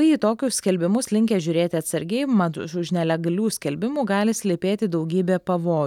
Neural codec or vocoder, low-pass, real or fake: none; 19.8 kHz; real